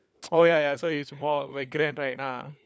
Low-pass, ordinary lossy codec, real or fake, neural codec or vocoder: none; none; fake; codec, 16 kHz, 4 kbps, FunCodec, trained on LibriTTS, 50 frames a second